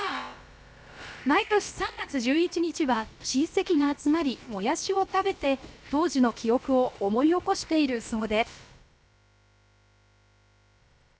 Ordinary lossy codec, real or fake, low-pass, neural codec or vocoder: none; fake; none; codec, 16 kHz, about 1 kbps, DyCAST, with the encoder's durations